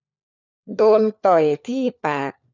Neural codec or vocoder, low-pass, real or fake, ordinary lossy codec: codec, 16 kHz, 4 kbps, FunCodec, trained on LibriTTS, 50 frames a second; 7.2 kHz; fake; none